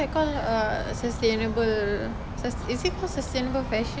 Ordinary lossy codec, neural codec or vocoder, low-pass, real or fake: none; none; none; real